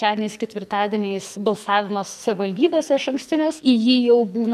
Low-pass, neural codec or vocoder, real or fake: 14.4 kHz; codec, 44.1 kHz, 2.6 kbps, SNAC; fake